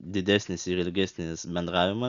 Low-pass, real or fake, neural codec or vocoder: 7.2 kHz; real; none